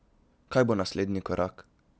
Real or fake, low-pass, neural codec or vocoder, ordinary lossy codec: real; none; none; none